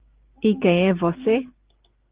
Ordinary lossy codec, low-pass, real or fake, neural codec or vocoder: Opus, 16 kbps; 3.6 kHz; real; none